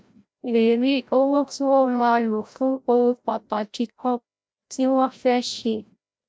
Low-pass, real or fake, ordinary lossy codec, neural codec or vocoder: none; fake; none; codec, 16 kHz, 0.5 kbps, FreqCodec, larger model